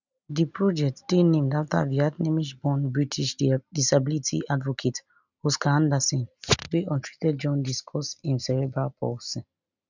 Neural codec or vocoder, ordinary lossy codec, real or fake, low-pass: none; none; real; 7.2 kHz